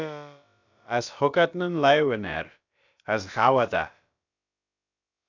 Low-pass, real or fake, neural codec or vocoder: 7.2 kHz; fake; codec, 16 kHz, about 1 kbps, DyCAST, with the encoder's durations